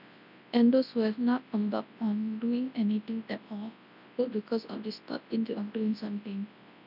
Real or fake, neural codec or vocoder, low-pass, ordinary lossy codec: fake; codec, 24 kHz, 0.9 kbps, WavTokenizer, large speech release; 5.4 kHz; none